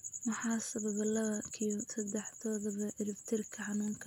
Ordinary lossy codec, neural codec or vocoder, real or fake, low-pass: none; none; real; 19.8 kHz